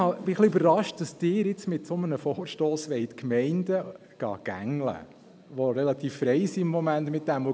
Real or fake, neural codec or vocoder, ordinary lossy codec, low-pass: real; none; none; none